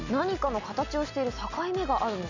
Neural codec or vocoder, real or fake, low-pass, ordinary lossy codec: none; real; 7.2 kHz; none